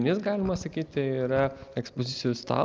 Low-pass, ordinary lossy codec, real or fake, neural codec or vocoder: 7.2 kHz; Opus, 32 kbps; real; none